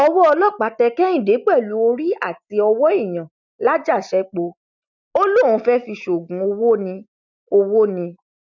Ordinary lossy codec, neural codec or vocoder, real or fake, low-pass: none; none; real; 7.2 kHz